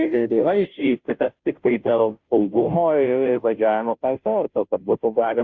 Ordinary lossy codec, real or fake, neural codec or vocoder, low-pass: Opus, 64 kbps; fake; codec, 16 kHz, 0.5 kbps, FunCodec, trained on Chinese and English, 25 frames a second; 7.2 kHz